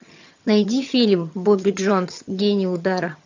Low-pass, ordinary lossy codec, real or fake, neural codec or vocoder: 7.2 kHz; AAC, 48 kbps; fake; vocoder, 22.05 kHz, 80 mel bands, HiFi-GAN